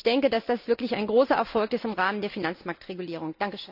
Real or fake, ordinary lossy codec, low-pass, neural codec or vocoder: real; none; 5.4 kHz; none